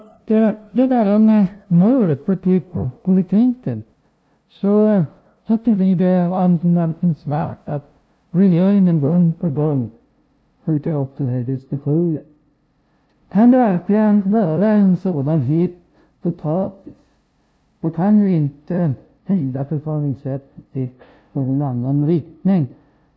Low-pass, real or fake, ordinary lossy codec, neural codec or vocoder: none; fake; none; codec, 16 kHz, 0.5 kbps, FunCodec, trained on LibriTTS, 25 frames a second